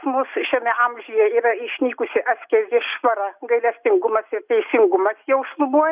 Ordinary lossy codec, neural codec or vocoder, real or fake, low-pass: Opus, 64 kbps; none; real; 3.6 kHz